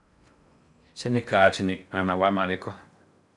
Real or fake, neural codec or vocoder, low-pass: fake; codec, 16 kHz in and 24 kHz out, 0.6 kbps, FocalCodec, streaming, 4096 codes; 10.8 kHz